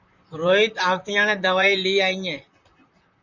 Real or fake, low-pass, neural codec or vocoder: fake; 7.2 kHz; vocoder, 44.1 kHz, 128 mel bands, Pupu-Vocoder